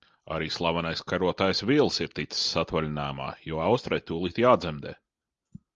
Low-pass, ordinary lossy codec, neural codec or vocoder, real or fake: 7.2 kHz; Opus, 32 kbps; none; real